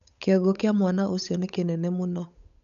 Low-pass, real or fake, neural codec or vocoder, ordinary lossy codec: 7.2 kHz; fake; codec, 16 kHz, 8 kbps, FunCodec, trained on Chinese and English, 25 frames a second; none